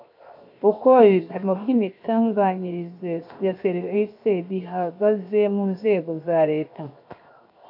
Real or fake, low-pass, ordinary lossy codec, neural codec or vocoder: fake; 5.4 kHz; AAC, 48 kbps; codec, 16 kHz, 0.7 kbps, FocalCodec